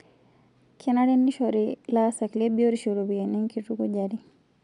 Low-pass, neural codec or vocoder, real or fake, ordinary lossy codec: 10.8 kHz; none; real; MP3, 96 kbps